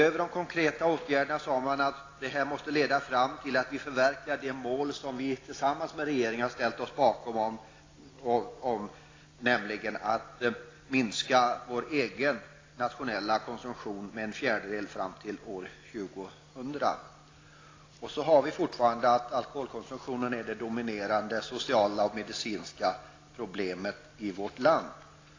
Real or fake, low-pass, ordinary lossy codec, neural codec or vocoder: real; 7.2 kHz; AAC, 32 kbps; none